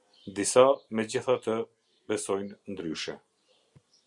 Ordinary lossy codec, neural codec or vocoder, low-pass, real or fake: Opus, 64 kbps; none; 10.8 kHz; real